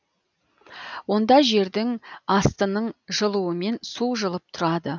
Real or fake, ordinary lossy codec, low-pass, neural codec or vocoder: real; none; 7.2 kHz; none